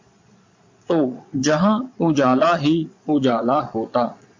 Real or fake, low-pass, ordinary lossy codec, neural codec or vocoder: fake; 7.2 kHz; MP3, 48 kbps; vocoder, 22.05 kHz, 80 mel bands, Vocos